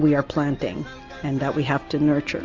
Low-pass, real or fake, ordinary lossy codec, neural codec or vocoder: 7.2 kHz; real; Opus, 32 kbps; none